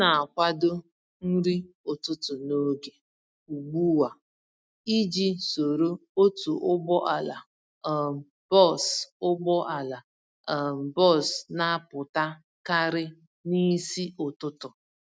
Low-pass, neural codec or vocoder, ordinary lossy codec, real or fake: none; none; none; real